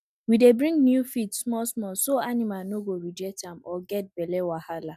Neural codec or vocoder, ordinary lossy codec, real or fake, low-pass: none; none; real; 14.4 kHz